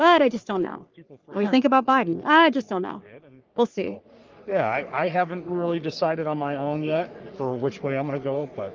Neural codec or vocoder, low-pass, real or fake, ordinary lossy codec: codec, 44.1 kHz, 3.4 kbps, Pupu-Codec; 7.2 kHz; fake; Opus, 32 kbps